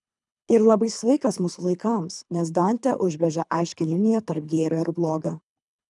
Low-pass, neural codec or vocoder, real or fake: 10.8 kHz; codec, 24 kHz, 3 kbps, HILCodec; fake